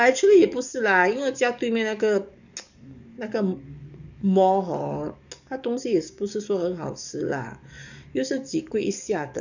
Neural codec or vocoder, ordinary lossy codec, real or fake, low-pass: codec, 44.1 kHz, 7.8 kbps, DAC; none; fake; 7.2 kHz